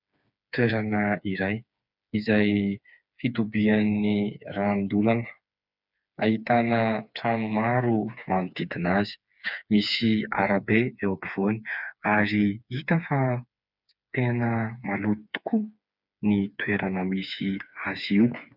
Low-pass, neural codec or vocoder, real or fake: 5.4 kHz; codec, 16 kHz, 4 kbps, FreqCodec, smaller model; fake